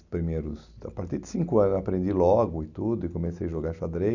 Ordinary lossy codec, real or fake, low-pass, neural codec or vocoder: none; real; 7.2 kHz; none